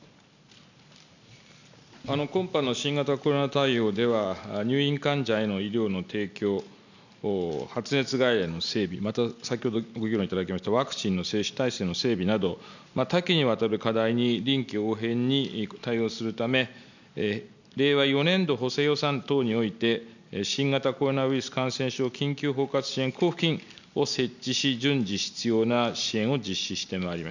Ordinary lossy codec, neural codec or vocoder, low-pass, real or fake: none; none; 7.2 kHz; real